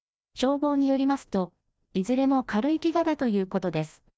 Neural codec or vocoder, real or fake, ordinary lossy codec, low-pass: codec, 16 kHz, 1 kbps, FreqCodec, larger model; fake; none; none